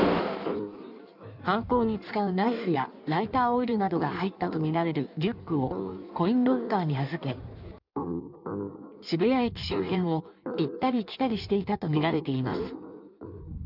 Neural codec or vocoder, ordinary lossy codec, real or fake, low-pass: codec, 16 kHz in and 24 kHz out, 1.1 kbps, FireRedTTS-2 codec; none; fake; 5.4 kHz